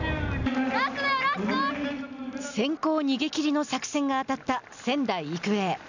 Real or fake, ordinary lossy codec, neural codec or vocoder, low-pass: real; none; none; 7.2 kHz